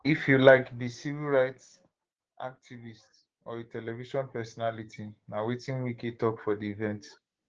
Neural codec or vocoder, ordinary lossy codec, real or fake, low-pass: codec, 16 kHz, 6 kbps, DAC; Opus, 16 kbps; fake; 7.2 kHz